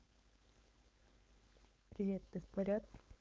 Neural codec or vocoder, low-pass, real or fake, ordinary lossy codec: codec, 16 kHz, 4.8 kbps, FACodec; none; fake; none